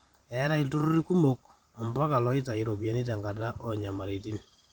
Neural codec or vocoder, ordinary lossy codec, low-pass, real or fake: vocoder, 44.1 kHz, 128 mel bands every 512 samples, BigVGAN v2; Opus, 32 kbps; 19.8 kHz; fake